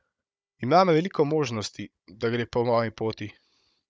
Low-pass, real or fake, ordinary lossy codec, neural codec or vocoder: none; fake; none; codec, 16 kHz, 16 kbps, FunCodec, trained on Chinese and English, 50 frames a second